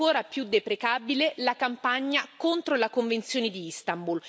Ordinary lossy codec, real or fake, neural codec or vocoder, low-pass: none; real; none; none